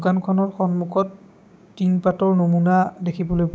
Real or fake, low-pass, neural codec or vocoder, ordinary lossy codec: fake; none; codec, 16 kHz, 6 kbps, DAC; none